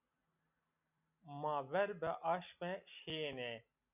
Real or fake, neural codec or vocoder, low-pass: real; none; 3.6 kHz